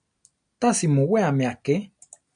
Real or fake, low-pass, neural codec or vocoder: real; 9.9 kHz; none